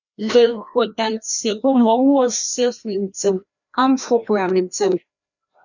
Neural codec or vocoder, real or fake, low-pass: codec, 16 kHz, 1 kbps, FreqCodec, larger model; fake; 7.2 kHz